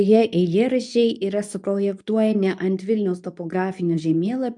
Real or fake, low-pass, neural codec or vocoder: fake; 10.8 kHz; codec, 24 kHz, 0.9 kbps, WavTokenizer, medium speech release version 2